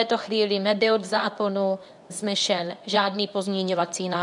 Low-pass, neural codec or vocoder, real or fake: 10.8 kHz; codec, 24 kHz, 0.9 kbps, WavTokenizer, medium speech release version 2; fake